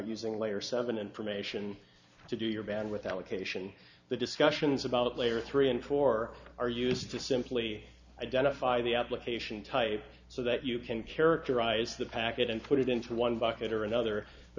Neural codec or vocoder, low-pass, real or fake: none; 7.2 kHz; real